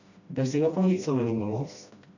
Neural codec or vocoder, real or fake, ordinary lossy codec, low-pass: codec, 16 kHz, 1 kbps, FreqCodec, smaller model; fake; none; 7.2 kHz